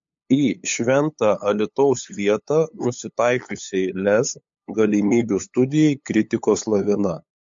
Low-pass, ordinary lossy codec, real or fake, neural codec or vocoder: 7.2 kHz; MP3, 48 kbps; fake; codec, 16 kHz, 8 kbps, FunCodec, trained on LibriTTS, 25 frames a second